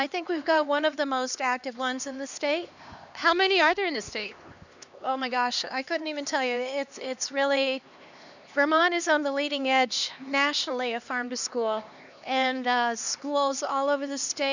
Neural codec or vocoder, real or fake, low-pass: codec, 16 kHz, 2 kbps, X-Codec, HuBERT features, trained on LibriSpeech; fake; 7.2 kHz